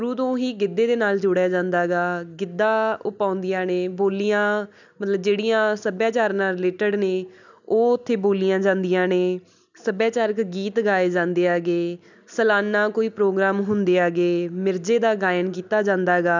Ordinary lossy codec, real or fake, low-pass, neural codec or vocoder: none; real; 7.2 kHz; none